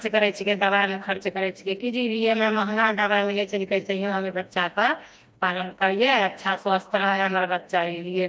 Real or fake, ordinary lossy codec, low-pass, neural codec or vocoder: fake; none; none; codec, 16 kHz, 1 kbps, FreqCodec, smaller model